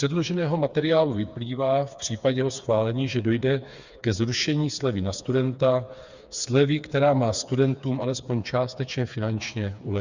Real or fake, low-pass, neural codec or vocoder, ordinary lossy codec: fake; 7.2 kHz; codec, 16 kHz, 4 kbps, FreqCodec, smaller model; Opus, 64 kbps